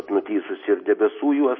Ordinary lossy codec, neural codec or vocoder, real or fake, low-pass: MP3, 24 kbps; none; real; 7.2 kHz